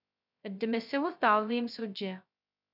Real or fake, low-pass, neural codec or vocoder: fake; 5.4 kHz; codec, 16 kHz, 0.2 kbps, FocalCodec